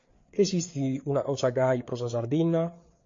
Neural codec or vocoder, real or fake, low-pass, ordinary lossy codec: codec, 16 kHz, 4 kbps, FreqCodec, larger model; fake; 7.2 kHz; MP3, 48 kbps